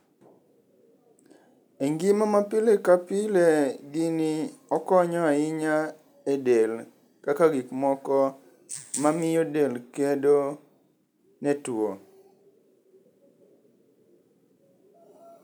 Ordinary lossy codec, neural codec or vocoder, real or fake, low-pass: none; none; real; none